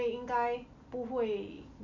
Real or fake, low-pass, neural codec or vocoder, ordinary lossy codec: real; 7.2 kHz; none; none